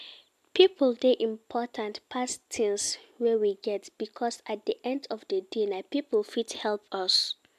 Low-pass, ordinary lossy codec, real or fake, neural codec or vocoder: 14.4 kHz; MP3, 96 kbps; real; none